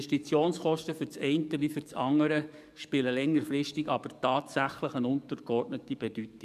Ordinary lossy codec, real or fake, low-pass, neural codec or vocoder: none; fake; 14.4 kHz; codec, 44.1 kHz, 7.8 kbps, DAC